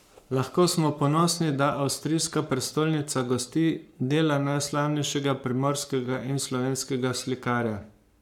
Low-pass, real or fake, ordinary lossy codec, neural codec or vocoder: 19.8 kHz; fake; none; codec, 44.1 kHz, 7.8 kbps, Pupu-Codec